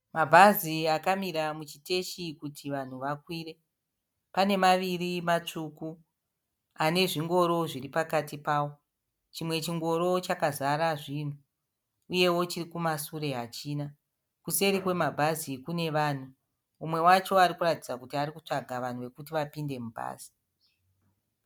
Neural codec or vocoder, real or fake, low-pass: none; real; 19.8 kHz